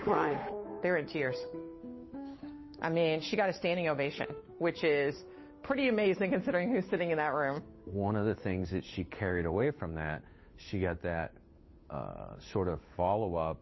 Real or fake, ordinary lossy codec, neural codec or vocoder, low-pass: fake; MP3, 24 kbps; codec, 16 kHz, 8 kbps, FunCodec, trained on Chinese and English, 25 frames a second; 7.2 kHz